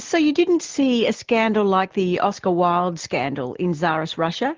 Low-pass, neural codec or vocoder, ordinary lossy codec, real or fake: 7.2 kHz; none; Opus, 16 kbps; real